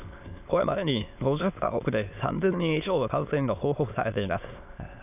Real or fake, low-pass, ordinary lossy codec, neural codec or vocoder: fake; 3.6 kHz; none; autoencoder, 22.05 kHz, a latent of 192 numbers a frame, VITS, trained on many speakers